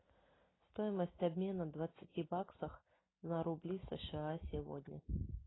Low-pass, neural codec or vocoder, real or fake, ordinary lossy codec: 7.2 kHz; none; real; AAC, 16 kbps